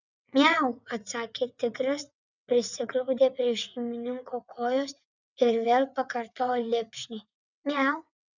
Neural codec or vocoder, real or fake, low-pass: vocoder, 22.05 kHz, 80 mel bands, WaveNeXt; fake; 7.2 kHz